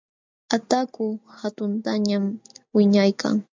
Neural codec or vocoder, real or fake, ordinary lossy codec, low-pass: none; real; MP3, 64 kbps; 7.2 kHz